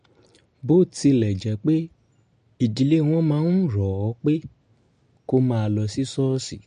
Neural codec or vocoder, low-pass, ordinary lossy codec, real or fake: none; 9.9 kHz; MP3, 48 kbps; real